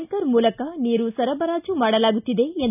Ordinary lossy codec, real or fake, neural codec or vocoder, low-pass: none; real; none; 3.6 kHz